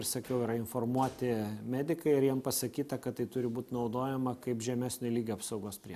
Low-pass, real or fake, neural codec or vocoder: 14.4 kHz; real; none